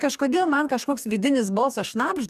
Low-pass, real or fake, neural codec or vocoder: 14.4 kHz; fake; codec, 44.1 kHz, 2.6 kbps, DAC